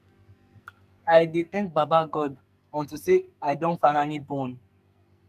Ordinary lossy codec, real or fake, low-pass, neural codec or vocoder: none; fake; 14.4 kHz; codec, 44.1 kHz, 2.6 kbps, SNAC